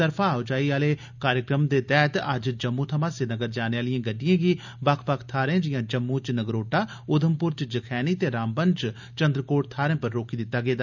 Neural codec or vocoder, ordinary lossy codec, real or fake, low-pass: none; none; real; 7.2 kHz